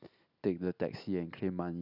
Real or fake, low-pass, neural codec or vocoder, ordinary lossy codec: real; 5.4 kHz; none; none